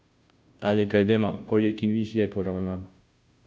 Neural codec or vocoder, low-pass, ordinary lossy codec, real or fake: codec, 16 kHz, 0.5 kbps, FunCodec, trained on Chinese and English, 25 frames a second; none; none; fake